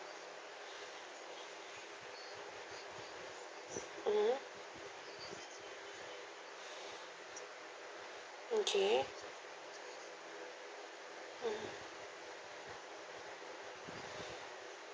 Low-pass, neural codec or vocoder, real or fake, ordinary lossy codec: none; none; real; none